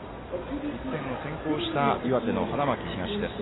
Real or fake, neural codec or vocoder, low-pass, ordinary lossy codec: real; none; 7.2 kHz; AAC, 16 kbps